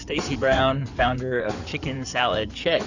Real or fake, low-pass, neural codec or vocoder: fake; 7.2 kHz; codec, 16 kHz in and 24 kHz out, 2.2 kbps, FireRedTTS-2 codec